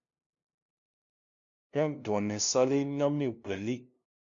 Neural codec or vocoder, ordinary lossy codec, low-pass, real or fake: codec, 16 kHz, 0.5 kbps, FunCodec, trained on LibriTTS, 25 frames a second; MP3, 64 kbps; 7.2 kHz; fake